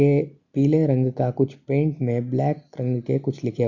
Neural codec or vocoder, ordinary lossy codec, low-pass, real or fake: none; none; 7.2 kHz; real